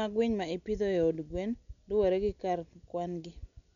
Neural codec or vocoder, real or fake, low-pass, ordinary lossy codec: none; real; 7.2 kHz; none